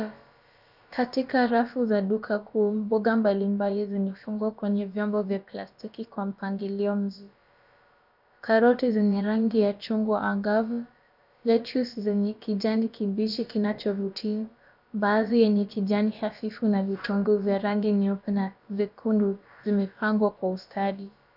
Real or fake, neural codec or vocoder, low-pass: fake; codec, 16 kHz, about 1 kbps, DyCAST, with the encoder's durations; 5.4 kHz